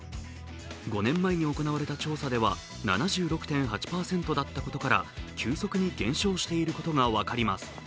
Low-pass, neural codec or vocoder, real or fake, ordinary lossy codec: none; none; real; none